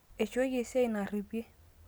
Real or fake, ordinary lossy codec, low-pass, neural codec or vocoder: real; none; none; none